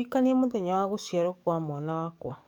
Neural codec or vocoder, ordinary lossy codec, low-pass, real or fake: codec, 44.1 kHz, 7.8 kbps, DAC; none; 19.8 kHz; fake